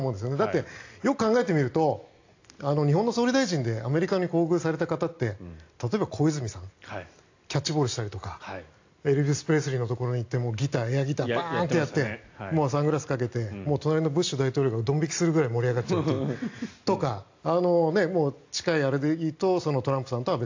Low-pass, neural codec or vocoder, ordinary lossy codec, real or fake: 7.2 kHz; none; AAC, 48 kbps; real